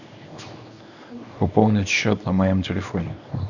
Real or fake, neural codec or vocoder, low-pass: fake; codec, 24 kHz, 0.9 kbps, WavTokenizer, small release; 7.2 kHz